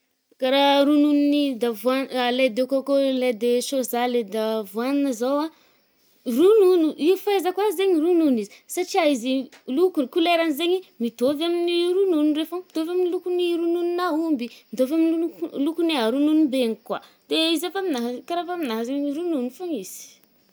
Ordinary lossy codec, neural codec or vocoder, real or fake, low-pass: none; none; real; none